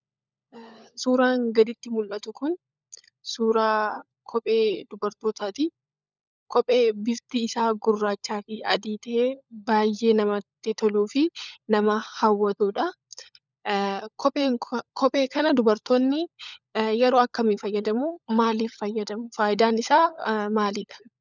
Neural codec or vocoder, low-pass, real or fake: codec, 16 kHz, 16 kbps, FunCodec, trained on LibriTTS, 50 frames a second; 7.2 kHz; fake